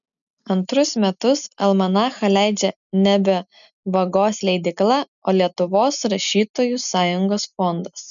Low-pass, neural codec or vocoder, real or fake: 7.2 kHz; none; real